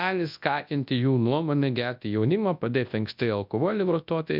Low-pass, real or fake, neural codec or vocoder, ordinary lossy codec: 5.4 kHz; fake; codec, 24 kHz, 0.9 kbps, WavTokenizer, large speech release; MP3, 48 kbps